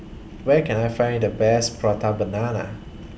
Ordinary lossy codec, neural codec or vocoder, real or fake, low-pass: none; none; real; none